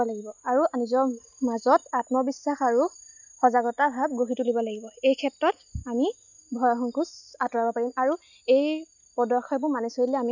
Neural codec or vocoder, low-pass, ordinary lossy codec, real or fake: none; 7.2 kHz; none; real